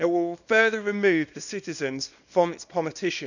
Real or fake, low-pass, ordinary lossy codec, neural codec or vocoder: fake; 7.2 kHz; none; codec, 24 kHz, 0.9 kbps, WavTokenizer, small release